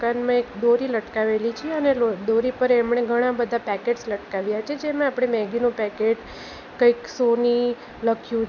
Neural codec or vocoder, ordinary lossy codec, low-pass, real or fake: none; none; 7.2 kHz; real